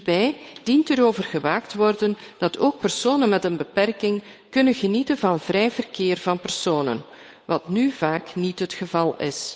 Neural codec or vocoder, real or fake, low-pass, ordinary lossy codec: codec, 16 kHz, 8 kbps, FunCodec, trained on Chinese and English, 25 frames a second; fake; none; none